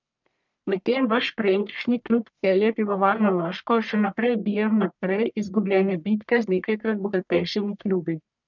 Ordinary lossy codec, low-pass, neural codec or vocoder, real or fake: none; 7.2 kHz; codec, 44.1 kHz, 1.7 kbps, Pupu-Codec; fake